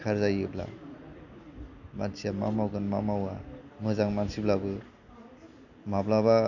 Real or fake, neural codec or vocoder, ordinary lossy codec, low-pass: real; none; none; 7.2 kHz